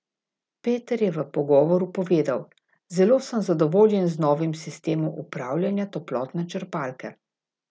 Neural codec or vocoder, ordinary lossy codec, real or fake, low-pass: none; none; real; none